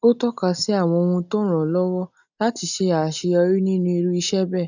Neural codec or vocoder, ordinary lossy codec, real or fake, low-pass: none; none; real; 7.2 kHz